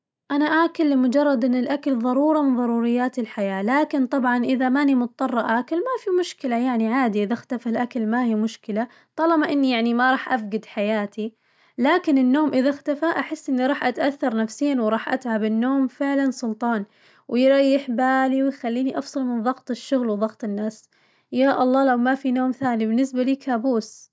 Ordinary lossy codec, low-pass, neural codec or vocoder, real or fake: none; none; none; real